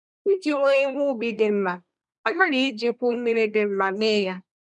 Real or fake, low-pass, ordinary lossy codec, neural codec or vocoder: fake; 10.8 kHz; none; codec, 24 kHz, 1 kbps, SNAC